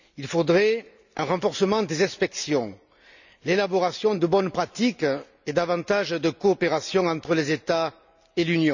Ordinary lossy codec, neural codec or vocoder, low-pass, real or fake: none; none; 7.2 kHz; real